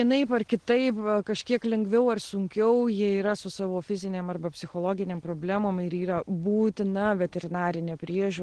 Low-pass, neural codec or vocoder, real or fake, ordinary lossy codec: 9.9 kHz; none; real; Opus, 16 kbps